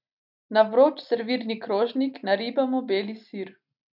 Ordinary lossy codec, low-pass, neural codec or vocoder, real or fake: none; 5.4 kHz; none; real